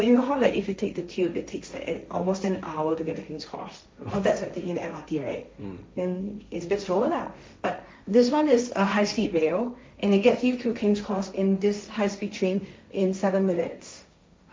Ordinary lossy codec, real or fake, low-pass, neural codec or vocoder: none; fake; none; codec, 16 kHz, 1.1 kbps, Voila-Tokenizer